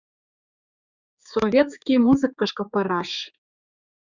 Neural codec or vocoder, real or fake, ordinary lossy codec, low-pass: codec, 16 kHz, 4 kbps, X-Codec, HuBERT features, trained on general audio; fake; Opus, 64 kbps; 7.2 kHz